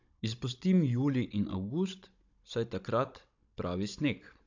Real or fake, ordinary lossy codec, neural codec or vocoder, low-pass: fake; AAC, 48 kbps; codec, 16 kHz, 16 kbps, FunCodec, trained on Chinese and English, 50 frames a second; 7.2 kHz